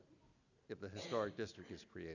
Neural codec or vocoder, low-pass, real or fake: none; 7.2 kHz; real